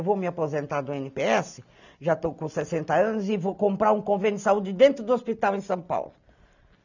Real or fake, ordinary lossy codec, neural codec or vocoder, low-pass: real; none; none; 7.2 kHz